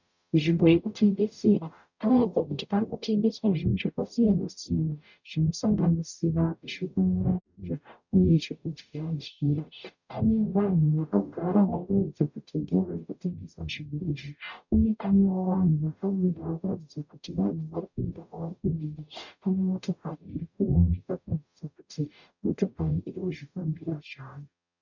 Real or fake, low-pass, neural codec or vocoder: fake; 7.2 kHz; codec, 44.1 kHz, 0.9 kbps, DAC